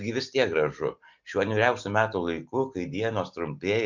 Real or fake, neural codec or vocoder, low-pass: real; none; 7.2 kHz